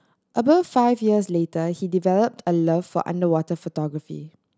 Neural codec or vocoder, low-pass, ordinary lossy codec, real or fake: none; none; none; real